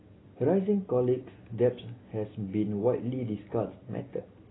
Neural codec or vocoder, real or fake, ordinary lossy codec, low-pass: none; real; AAC, 16 kbps; 7.2 kHz